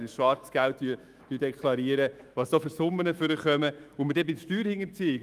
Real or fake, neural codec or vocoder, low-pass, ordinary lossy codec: fake; autoencoder, 48 kHz, 128 numbers a frame, DAC-VAE, trained on Japanese speech; 14.4 kHz; Opus, 32 kbps